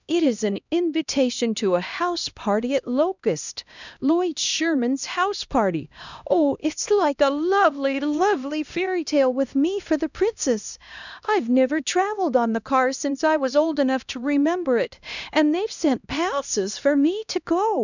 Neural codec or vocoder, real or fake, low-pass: codec, 16 kHz, 1 kbps, X-Codec, HuBERT features, trained on LibriSpeech; fake; 7.2 kHz